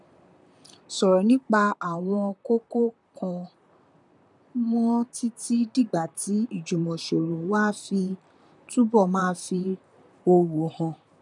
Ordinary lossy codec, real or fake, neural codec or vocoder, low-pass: none; fake; vocoder, 44.1 kHz, 128 mel bands, Pupu-Vocoder; 10.8 kHz